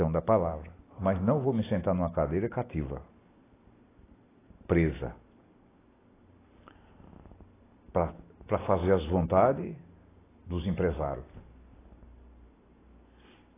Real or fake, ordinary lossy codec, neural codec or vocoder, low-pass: real; AAC, 16 kbps; none; 3.6 kHz